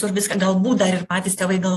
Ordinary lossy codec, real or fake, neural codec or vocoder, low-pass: AAC, 48 kbps; real; none; 14.4 kHz